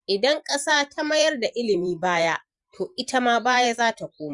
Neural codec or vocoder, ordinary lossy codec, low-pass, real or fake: vocoder, 44.1 kHz, 128 mel bands every 512 samples, BigVGAN v2; Opus, 64 kbps; 10.8 kHz; fake